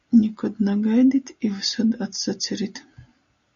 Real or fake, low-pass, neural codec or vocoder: real; 7.2 kHz; none